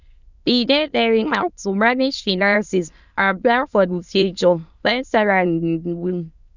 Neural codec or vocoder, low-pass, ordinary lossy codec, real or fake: autoencoder, 22.05 kHz, a latent of 192 numbers a frame, VITS, trained on many speakers; 7.2 kHz; none; fake